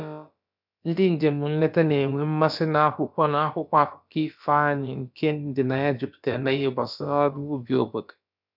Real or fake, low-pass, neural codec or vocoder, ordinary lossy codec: fake; 5.4 kHz; codec, 16 kHz, about 1 kbps, DyCAST, with the encoder's durations; none